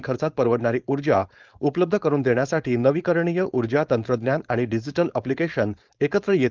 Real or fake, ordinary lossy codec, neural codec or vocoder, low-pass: fake; Opus, 32 kbps; codec, 16 kHz, 4.8 kbps, FACodec; 7.2 kHz